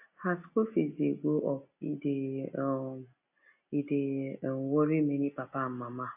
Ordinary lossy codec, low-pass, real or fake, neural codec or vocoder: AAC, 32 kbps; 3.6 kHz; real; none